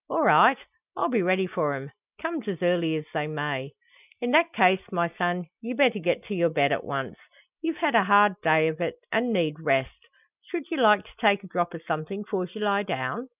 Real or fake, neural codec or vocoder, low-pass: real; none; 3.6 kHz